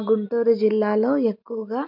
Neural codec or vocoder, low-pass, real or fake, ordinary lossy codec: none; 5.4 kHz; real; MP3, 48 kbps